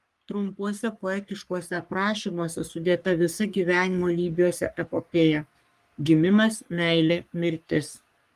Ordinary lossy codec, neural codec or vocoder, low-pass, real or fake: Opus, 32 kbps; codec, 44.1 kHz, 3.4 kbps, Pupu-Codec; 14.4 kHz; fake